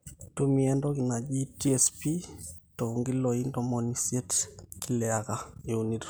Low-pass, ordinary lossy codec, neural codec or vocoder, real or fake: none; none; none; real